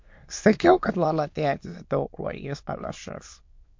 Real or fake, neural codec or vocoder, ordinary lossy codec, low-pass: fake; autoencoder, 22.05 kHz, a latent of 192 numbers a frame, VITS, trained on many speakers; MP3, 48 kbps; 7.2 kHz